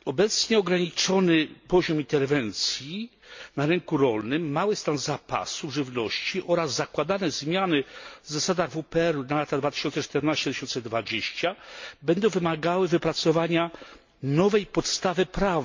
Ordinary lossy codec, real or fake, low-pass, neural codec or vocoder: MP3, 48 kbps; real; 7.2 kHz; none